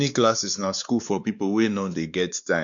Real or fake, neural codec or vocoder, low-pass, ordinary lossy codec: fake; codec, 16 kHz, 2 kbps, X-Codec, WavLM features, trained on Multilingual LibriSpeech; 7.2 kHz; none